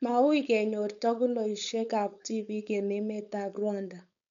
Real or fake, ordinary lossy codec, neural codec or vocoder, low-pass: fake; none; codec, 16 kHz, 4.8 kbps, FACodec; 7.2 kHz